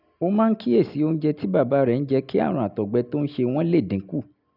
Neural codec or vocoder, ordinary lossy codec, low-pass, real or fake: none; none; 5.4 kHz; real